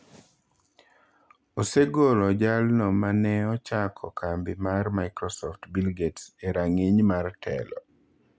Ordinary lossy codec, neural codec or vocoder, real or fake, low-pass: none; none; real; none